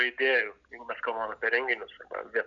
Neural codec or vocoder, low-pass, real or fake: none; 7.2 kHz; real